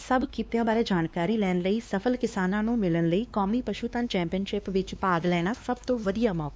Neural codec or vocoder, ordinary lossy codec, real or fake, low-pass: codec, 16 kHz, 2 kbps, X-Codec, WavLM features, trained on Multilingual LibriSpeech; none; fake; none